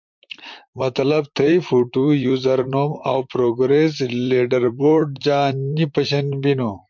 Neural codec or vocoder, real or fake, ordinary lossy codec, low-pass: vocoder, 44.1 kHz, 128 mel bands, Pupu-Vocoder; fake; MP3, 64 kbps; 7.2 kHz